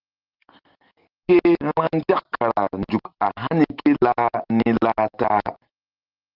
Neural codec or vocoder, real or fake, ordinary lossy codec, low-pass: none; real; Opus, 16 kbps; 5.4 kHz